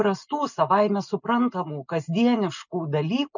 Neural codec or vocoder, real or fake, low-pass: none; real; 7.2 kHz